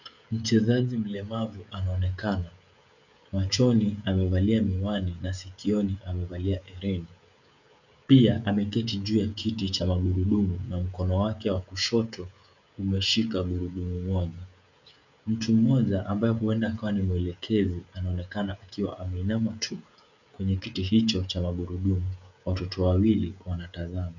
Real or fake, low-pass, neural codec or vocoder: fake; 7.2 kHz; codec, 16 kHz, 16 kbps, FreqCodec, smaller model